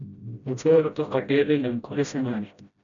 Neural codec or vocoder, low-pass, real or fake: codec, 16 kHz, 0.5 kbps, FreqCodec, smaller model; 7.2 kHz; fake